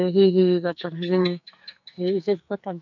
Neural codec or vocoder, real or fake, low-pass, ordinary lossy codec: codec, 44.1 kHz, 2.6 kbps, SNAC; fake; 7.2 kHz; none